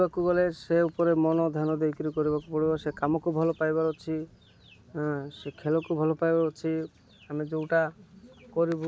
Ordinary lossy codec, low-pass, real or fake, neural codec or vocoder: none; none; real; none